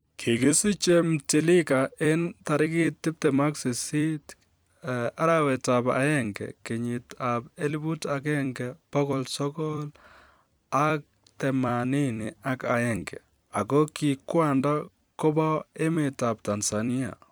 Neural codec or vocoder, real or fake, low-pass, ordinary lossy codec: vocoder, 44.1 kHz, 128 mel bands every 256 samples, BigVGAN v2; fake; none; none